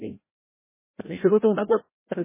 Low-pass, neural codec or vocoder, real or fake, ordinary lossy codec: 3.6 kHz; codec, 16 kHz, 0.5 kbps, FreqCodec, larger model; fake; MP3, 16 kbps